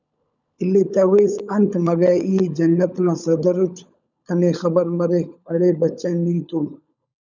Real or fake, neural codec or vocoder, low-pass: fake; codec, 16 kHz, 16 kbps, FunCodec, trained on LibriTTS, 50 frames a second; 7.2 kHz